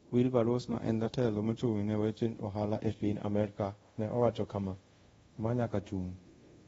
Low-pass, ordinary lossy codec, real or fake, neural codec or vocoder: 10.8 kHz; AAC, 24 kbps; fake; codec, 24 kHz, 0.5 kbps, DualCodec